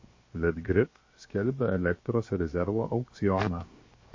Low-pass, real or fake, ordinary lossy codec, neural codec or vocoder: 7.2 kHz; fake; MP3, 32 kbps; codec, 16 kHz, 0.7 kbps, FocalCodec